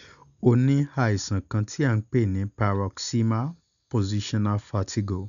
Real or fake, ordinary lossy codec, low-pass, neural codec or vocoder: real; none; 7.2 kHz; none